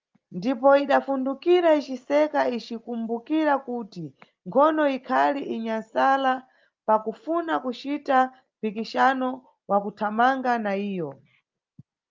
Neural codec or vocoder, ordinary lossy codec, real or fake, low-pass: none; Opus, 24 kbps; real; 7.2 kHz